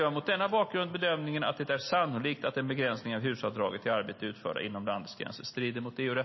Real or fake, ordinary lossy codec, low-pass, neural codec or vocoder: real; MP3, 24 kbps; 7.2 kHz; none